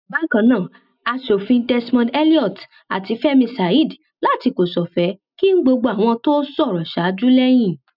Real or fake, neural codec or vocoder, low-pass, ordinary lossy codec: real; none; 5.4 kHz; none